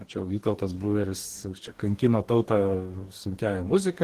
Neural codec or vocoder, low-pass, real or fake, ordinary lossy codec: codec, 44.1 kHz, 2.6 kbps, DAC; 14.4 kHz; fake; Opus, 16 kbps